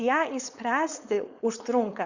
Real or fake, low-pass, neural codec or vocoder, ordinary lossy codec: fake; 7.2 kHz; codec, 16 kHz, 4.8 kbps, FACodec; Opus, 64 kbps